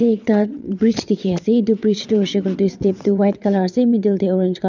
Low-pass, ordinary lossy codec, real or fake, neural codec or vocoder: 7.2 kHz; none; fake; vocoder, 22.05 kHz, 80 mel bands, WaveNeXt